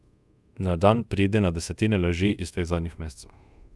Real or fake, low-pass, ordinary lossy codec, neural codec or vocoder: fake; none; none; codec, 24 kHz, 0.5 kbps, DualCodec